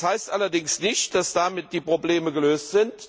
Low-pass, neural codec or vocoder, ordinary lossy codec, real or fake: none; none; none; real